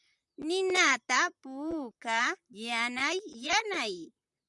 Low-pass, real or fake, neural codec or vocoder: 10.8 kHz; fake; vocoder, 44.1 kHz, 128 mel bands, Pupu-Vocoder